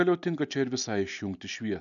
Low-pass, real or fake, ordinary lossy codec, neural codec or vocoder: 7.2 kHz; real; MP3, 96 kbps; none